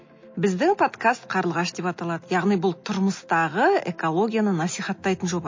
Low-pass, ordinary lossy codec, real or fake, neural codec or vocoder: 7.2 kHz; MP3, 32 kbps; real; none